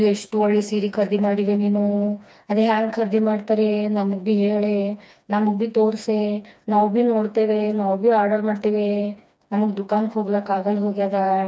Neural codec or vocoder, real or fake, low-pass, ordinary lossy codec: codec, 16 kHz, 2 kbps, FreqCodec, smaller model; fake; none; none